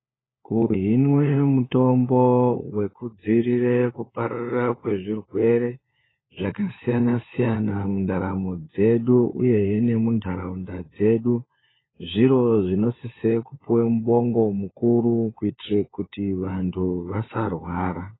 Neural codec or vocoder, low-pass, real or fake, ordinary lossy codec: codec, 16 kHz, 4 kbps, FunCodec, trained on LibriTTS, 50 frames a second; 7.2 kHz; fake; AAC, 16 kbps